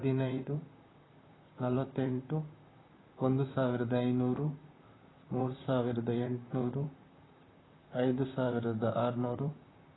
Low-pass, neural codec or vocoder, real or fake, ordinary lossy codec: 7.2 kHz; vocoder, 44.1 kHz, 128 mel bands, Pupu-Vocoder; fake; AAC, 16 kbps